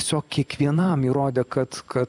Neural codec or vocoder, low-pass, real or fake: vocoder, 44.1 kHz, 128 mel bands every 256 samples, BigVGAN v2; 10.8 kHz; fake